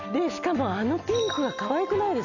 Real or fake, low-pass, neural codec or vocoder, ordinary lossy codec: real; 7.2 kHz; none; none